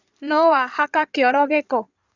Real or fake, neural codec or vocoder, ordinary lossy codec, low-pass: fake; codec, 16 kHz in and 24 kHz out, 2.2 kbps, FireRedTTS-2 codec; none; 7.2 kHz